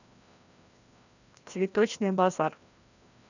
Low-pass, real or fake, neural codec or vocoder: 7.2 kHz; fake; codec, 16 kHz, 1 kbps, FreqCodec, larger model